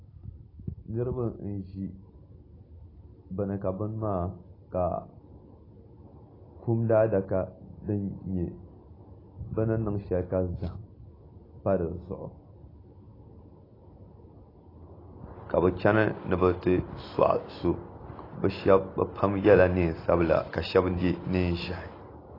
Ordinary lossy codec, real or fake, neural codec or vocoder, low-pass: AAC, 24 kbps; real; none; 5.4 kHz